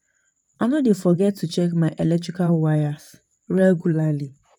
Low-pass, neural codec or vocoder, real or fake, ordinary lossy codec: 19.8 kHz; vocoder, 44.1 kHz, 128 mel bands, Pupu-Vocoder; fake; none